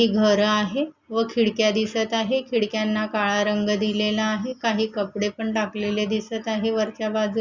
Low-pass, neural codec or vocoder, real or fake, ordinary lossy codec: 7.2 kHz; none; real; Opus, 64 kbps